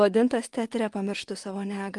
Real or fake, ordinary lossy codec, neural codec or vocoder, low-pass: fake; Opus, 24 kbps; vocoder, 24 kHz, 100 mel bands, Vocos; 10.8 kHz